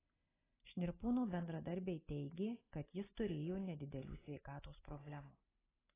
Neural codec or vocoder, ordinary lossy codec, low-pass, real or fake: none; AAC, 16 kbps; 3.6 kHz; real